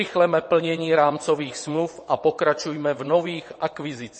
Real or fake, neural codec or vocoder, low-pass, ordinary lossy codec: fake; vocoder, 22.05 kHz, 80 mel bands, WaveNeXt; 9.9 kHz; MP3, 32 kbps